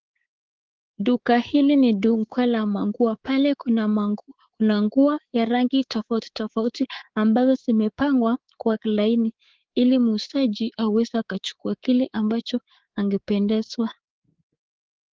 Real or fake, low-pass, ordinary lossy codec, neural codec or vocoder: fake; 7.2 kHz; Opus, 16 kbps; codec, 24 kHz, 3.1 kbps, DualCodec